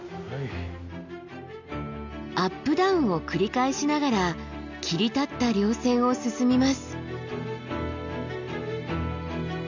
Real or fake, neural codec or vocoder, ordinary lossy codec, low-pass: real; none; none; 7.2 kHz